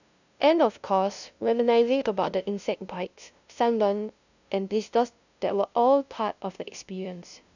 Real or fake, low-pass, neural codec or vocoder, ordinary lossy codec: fake; 7.2 kHz; codec, 16 kHz, 0.5 kbps, FunCodec, trained on LibriTTS, 25 frames a second; none